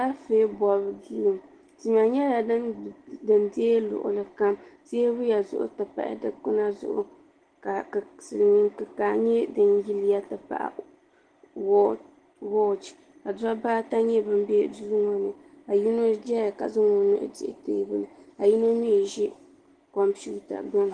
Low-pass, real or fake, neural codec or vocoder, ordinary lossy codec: 9.9 kHz; real; none; Opus, 16 kbps